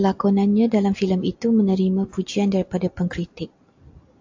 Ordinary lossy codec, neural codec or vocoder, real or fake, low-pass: AAC, 48 kbps; none; real; 7.2 kHz